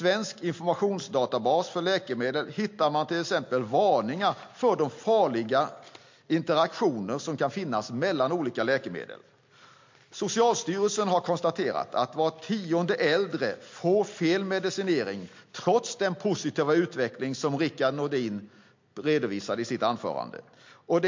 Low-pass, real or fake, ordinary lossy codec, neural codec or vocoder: 7.2 kHz; real; MP3, 48 kbps; none